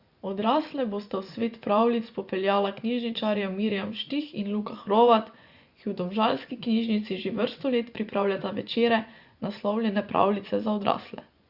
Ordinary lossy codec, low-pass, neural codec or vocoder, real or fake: Opus, 64 kbps; 5.4 kHz; vocoder, 24 kHz, 100 mel bands, Vocos; fake